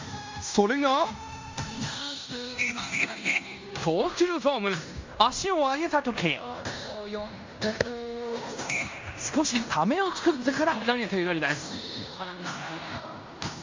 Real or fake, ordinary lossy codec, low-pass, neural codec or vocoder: fake; MP3, 64 kbps; 7.2 kHz; codec, 16 kHz in and 24 kHz out, 0.9 kbps, LongCat-Audio-Codec, fine tuned four codebook decoder